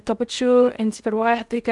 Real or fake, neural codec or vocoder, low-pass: fake; codec, 16 kHz in and 24 kHz out, 0.6 kbps, FocalCodec, streaming, 4096 codes; 10.8 kHz